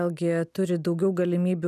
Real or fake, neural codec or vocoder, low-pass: real; none; 14.4 kHz